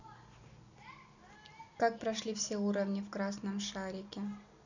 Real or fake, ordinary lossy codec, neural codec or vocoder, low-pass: real; none; none; 7.2 kHz